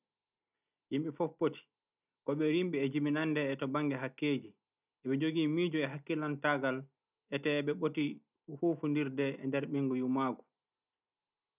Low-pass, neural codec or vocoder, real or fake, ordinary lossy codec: 3.6 kHz; none; real; none